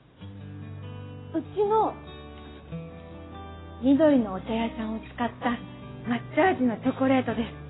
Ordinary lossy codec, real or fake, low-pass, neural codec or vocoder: AAC, 16 kbps; real; 7.2 kHz; none